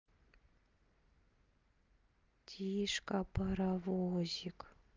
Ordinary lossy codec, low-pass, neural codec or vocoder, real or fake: Opus, 32 kbps; 7.2 kHz; none; real